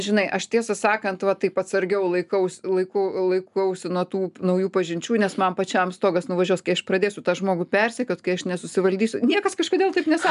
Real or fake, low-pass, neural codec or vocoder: real; 10.8 kHz; none